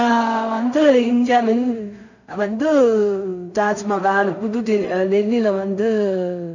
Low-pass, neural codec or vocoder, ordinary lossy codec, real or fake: 7.2 kHz; codec, 16 kHz in and 24 kHz out, 0.4 kbps, LongCat-Audio-Codec, two codebook decoder; none; fake